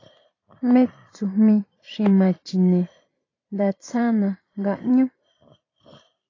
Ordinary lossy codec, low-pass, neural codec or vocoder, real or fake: AAC, 32 kbps; 7.2 kHz; vocoder, 44.1 kHz, 128 mel bands every 512 samples, BigVGAN v2; fake